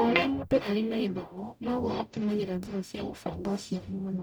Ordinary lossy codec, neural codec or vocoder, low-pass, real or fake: none; codec, 44.1 kHz, 0.9 kbps, DAC; none; fake